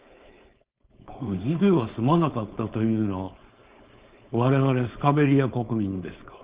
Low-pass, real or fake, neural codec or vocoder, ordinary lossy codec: 3.6 kHz; fake; codec, 16 kHz, 4.8 kbps, FACodec; Opus, 32 kbps